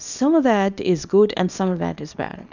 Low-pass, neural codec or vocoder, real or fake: 7.2 kHz; codec, 24 kHz, 0.9 kbps, WavTokenizer, small release; fake